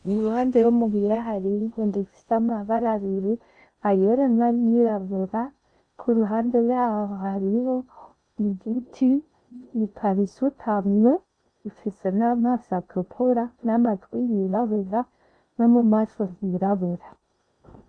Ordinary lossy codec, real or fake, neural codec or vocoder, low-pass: Opus, 64 kbps; fake; codec, 16 kHz in and 24 kHz out, 0.6 kbps, FocalCodec, streaming, 4096 codes; 9.9 kHz